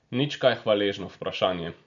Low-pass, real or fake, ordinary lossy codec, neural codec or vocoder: 7.2 kHz; real; none; none